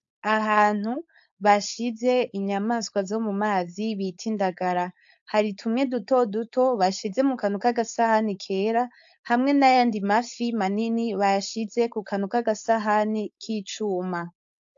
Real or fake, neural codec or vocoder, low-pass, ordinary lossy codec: fake; codec, 16 kHz, 4.8 kbps, FACodec; 7.2 kHz; AAC, 64 kbps